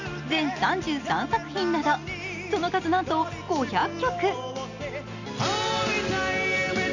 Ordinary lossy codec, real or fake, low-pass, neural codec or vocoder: none; real; 7.2 kHz; none